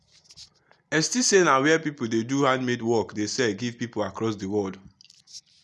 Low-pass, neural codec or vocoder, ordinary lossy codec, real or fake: none; none; none; real